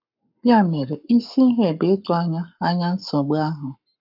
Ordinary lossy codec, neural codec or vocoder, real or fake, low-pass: AAC, 48 kbps; codec, 44.1 kHz, 7.8 kbps, Pupu-Codec; fake; 5.4 kHz